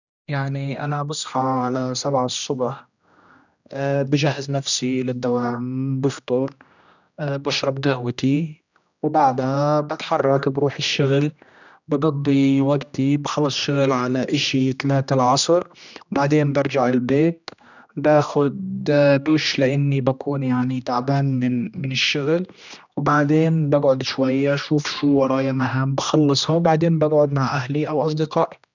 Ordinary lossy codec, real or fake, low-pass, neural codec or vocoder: none; fake; 7.2 kHz; codec, 16 kHz, 1 kbps, X-Codec, HuBERT features, trained on general audio